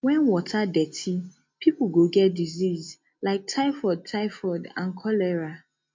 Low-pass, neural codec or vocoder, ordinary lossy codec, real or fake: 7.2 kHz; none; MP3, 48 kbps; real